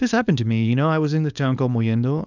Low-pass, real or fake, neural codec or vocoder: 7.2 kHz; fake; codec, 24 kHz, 0.9 kbps, WavTokenizer, small release